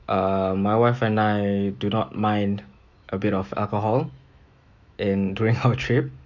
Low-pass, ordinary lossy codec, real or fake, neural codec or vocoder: 7.2 kHz; none; fake; autoencoder, 48 kHz, 128 numbers a frame, DAC-VAE, trained on Japanese speech